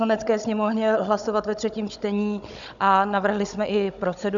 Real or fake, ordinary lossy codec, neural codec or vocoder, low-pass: fake; MP3, 96 kbps; codec, 16 kHz, 16 kbps, FunCodec, trained on Chinese and English, 50 frames a second; 7.2 kHz